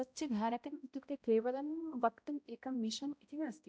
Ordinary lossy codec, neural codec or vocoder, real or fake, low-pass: none; codec, 16 kHz, 0.5 kbps, X-Codec, HuBERT features, trained on balanced general audio; fake; none